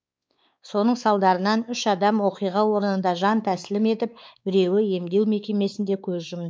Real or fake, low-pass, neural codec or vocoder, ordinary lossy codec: fake; none; codec, 16 kHz, 4 kbps, X-Codec, WavLM features, trained on Multilingual LibriSpeech; none